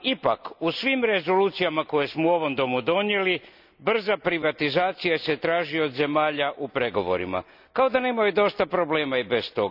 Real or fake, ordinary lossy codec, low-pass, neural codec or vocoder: real; none; 5.4 kHz; none